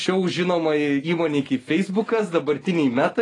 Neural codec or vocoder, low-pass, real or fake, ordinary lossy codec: none; 10.8 kHz; real; AAC, 32 kbps